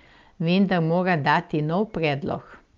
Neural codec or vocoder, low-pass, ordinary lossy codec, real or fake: none; 7.2 kHz; Opus, 24 kbps; real